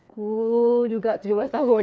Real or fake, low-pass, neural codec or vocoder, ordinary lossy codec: fake; none; codec, 16 kHz, 2 kbps, FreqCodec, larger model; none